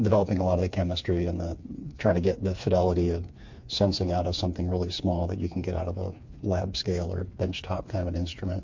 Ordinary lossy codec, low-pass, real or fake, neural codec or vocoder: MP3, 48 kbps; 7.2 kHz; fake; codec, 16 kHz, 4 kbps, FreqCodec, smaller model